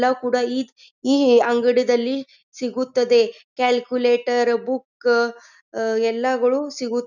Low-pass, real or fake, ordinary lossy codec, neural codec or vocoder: 7.2 kHz; real; none; none